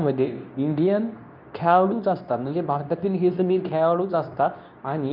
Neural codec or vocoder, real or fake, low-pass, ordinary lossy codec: codec, 24 kHz, 0.9 kbps, WavTokenizer, medium speech release version 2; fake; 5.4 kHz; none